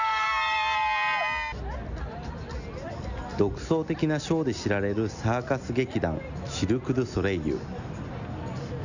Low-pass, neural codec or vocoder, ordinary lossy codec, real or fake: 7.2 kHz; none; none; real